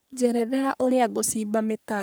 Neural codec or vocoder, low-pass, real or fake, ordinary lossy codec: codec, 44.1 kHz, 3.4 kbps, Pupu-Codec; none; fake; none